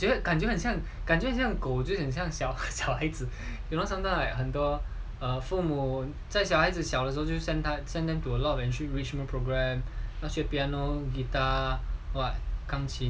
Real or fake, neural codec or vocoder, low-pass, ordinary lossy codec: real; none; none; none